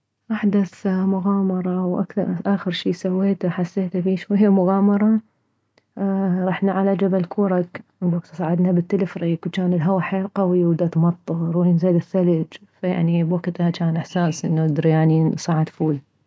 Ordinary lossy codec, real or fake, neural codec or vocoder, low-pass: none; real; none; none